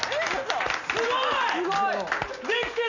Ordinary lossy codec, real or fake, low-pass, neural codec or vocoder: none; real; 7.2 kHz; none